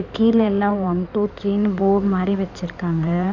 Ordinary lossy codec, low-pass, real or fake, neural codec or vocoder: none; 7.2 kHz; fake; codec, 16 kHz in and 24 kHz out, 2.2 kbps, FireRedTTS-2 codec